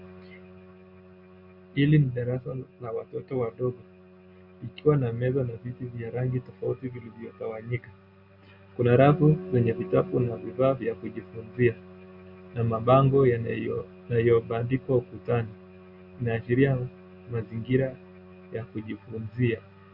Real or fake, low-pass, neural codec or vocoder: real; 5.4 kHz; none